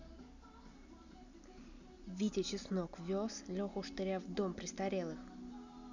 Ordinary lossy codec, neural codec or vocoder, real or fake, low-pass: none; none; real; 7.2 kHz